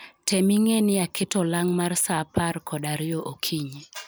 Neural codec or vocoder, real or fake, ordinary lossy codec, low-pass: none; real; none; none